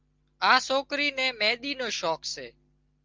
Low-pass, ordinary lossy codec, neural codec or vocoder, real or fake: 7.2 kHz; Opus, 32 kbps; none; real